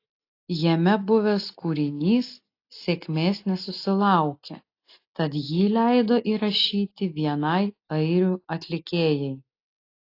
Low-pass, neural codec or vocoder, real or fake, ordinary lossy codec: 5.4 kHz; none; real; AAC, 32 kbps